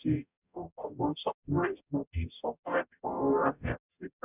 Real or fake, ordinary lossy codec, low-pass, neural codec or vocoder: fake; none; 3.6 kHz; codec, 44.1 kHz, 0.9 kbps, DAC